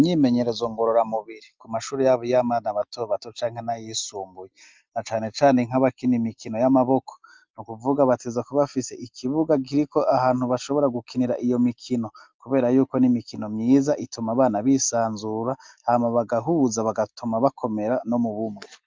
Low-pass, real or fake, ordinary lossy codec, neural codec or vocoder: 7.2 kHz; real; Opus, 32 kbps; none